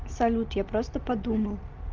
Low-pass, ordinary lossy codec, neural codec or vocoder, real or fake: 7.2 kHz; Opus, 24 kbps; none; real